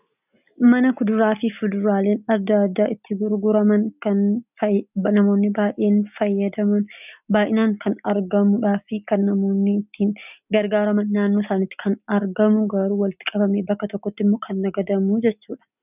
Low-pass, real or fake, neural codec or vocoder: 3.6 kHz; real; none